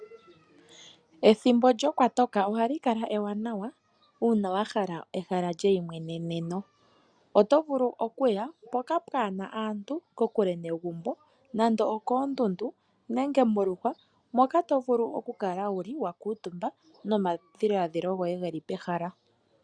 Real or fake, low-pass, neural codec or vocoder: real; 9.9 kHz; none